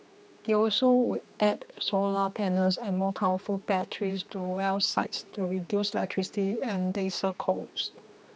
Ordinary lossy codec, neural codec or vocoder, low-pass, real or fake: none; codec, 16 kHz, 2 kbps, X-Codec, HuBERT features, trained on general audio; none; fake